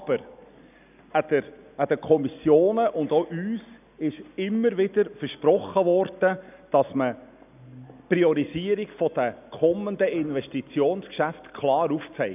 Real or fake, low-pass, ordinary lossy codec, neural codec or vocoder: real; 3.6 kHz; none; none